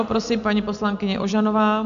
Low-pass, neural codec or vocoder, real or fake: 7.2 kHz; none; real